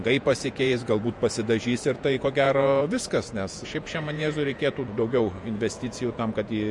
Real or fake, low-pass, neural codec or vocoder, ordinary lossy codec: fake; 10.8 kHz; vocoder, 48 kHz, 128 mel bands, Vocos; MP3, 48 kbps